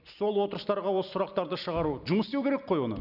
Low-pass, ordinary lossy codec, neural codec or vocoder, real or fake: 5.4 kHz; none; none; real